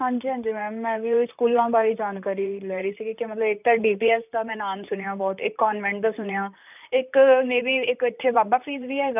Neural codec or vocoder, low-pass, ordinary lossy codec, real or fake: vocoder, 44.1 kHz, 128 mel bands, Pupu-Vocoder; 3.6 kHz; none; fake